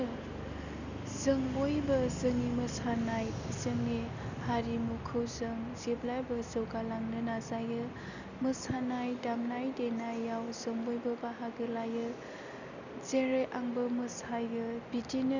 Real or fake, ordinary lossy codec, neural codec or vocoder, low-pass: real; none; none; 7.2 kHz